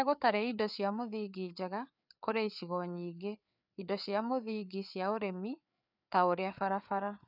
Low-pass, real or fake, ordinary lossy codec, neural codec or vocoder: 5.4 kHz; fake; none; codec, 16 kHz, 4 kbps, FreqCodec, larger model